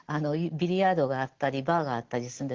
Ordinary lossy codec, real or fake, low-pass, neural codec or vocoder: Opus, 16 kbps; real; 7.2 kHz; none